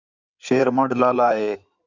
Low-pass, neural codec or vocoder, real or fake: 7.2 kHz; codec, 16 kHz in and 24 kHz out, 2.2 kbps, FireRedTTS-2 codec; fake